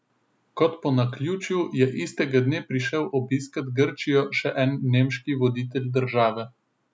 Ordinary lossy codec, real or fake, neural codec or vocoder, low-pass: none; real; none; none